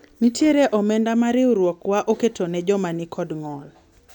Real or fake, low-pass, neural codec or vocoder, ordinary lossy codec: real; 19.8 kHz; none; none